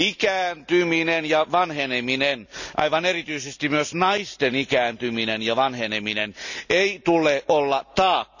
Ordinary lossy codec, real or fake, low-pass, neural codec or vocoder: none; real; 7.2 kHz; none